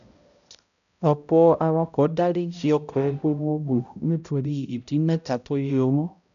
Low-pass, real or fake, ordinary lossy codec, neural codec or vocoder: 7.2 kHz; fake; none; codec, 16 kHz, 0.5 kbps, X-Codec, HuBERT features, trained on balanced general audio